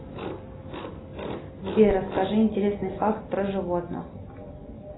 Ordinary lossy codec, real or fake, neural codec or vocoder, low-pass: AAC, 16 kbps; real; none; 7.2 kHz